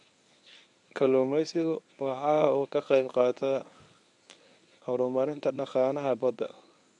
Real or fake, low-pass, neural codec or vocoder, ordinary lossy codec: fake; 10.8 kHz; codec, 24 kHz, 0.9 kbps, WavTokenizer, medium speech release version 1; none